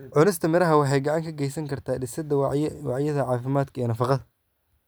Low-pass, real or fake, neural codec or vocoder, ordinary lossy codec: none; fake; vocoder, 44.1 kHz, 128 mel bands every 256 samples, BigVGAN v2; none